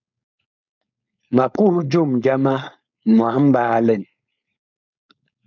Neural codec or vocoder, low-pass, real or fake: codec, 16 kHz, 4.8 kbps, FACodec; 7.2 kHz; fake